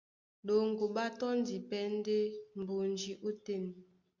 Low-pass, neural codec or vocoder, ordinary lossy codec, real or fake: 7.2 kHz; none; Opus, 64 kbps; real